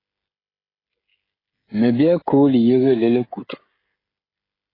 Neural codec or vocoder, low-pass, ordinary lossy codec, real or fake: codec, 16 kHz, 16 kbps, FreqCodec, smaller model; 5.4 kHz; AAC, 24 kbps; fake